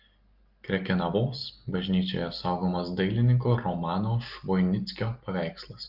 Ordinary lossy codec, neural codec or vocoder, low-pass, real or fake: Opus, 32 kbps; none; 5.4 kHz; real